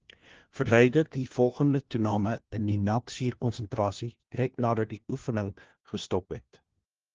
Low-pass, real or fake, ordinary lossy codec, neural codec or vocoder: 7.2 kHz; fake; Opus, 16 kbps; codec, 16 kHz, 1 kbps, FunCodec, trained on LibriTTS, 50 frames a second